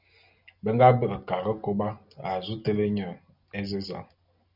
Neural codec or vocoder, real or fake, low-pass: none; real; 5.4 kHz